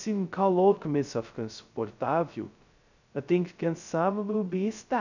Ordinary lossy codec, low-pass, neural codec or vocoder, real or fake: none; 7.2 kHz; codec, 16 kHz, 0.2 kbps, FocalCodec; fake